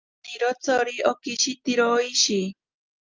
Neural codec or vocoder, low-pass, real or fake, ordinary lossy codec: none; 7.2 kHz; real; Opus, 24 kbps